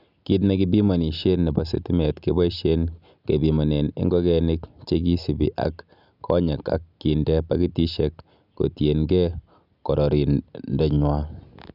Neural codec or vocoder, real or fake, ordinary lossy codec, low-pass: none; real; none; 5.4 kHz